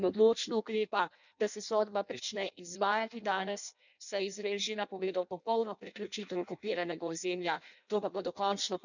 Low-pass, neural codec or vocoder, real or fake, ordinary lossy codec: 7.2 kHz; codec, 16 kHz in and 24 kHz out, 0.6 kbps, FireRedTTS-2 codec; fake; none